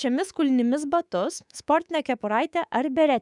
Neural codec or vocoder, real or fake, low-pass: codec, 24 kHz, 3.1 kbps, DualCodec; fake; 10.8 kHz